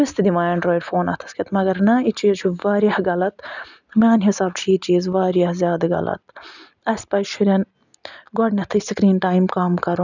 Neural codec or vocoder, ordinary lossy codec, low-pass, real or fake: none; none; 7.2 kHz; real